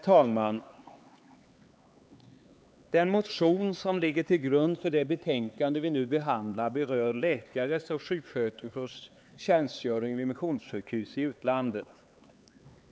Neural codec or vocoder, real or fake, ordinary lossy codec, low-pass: codec, 16 kHz, 4 kbps, X-Codec, HuBERT features, trained on LibriSpeech; fake; none; none